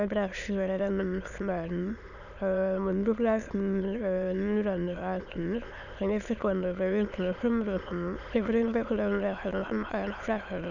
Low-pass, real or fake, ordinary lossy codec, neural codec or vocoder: 7.2 kHz; fake; none; autoencoder, 22.05 kHz, a latent of 192 numbers a frame, VITS, trained on many speakers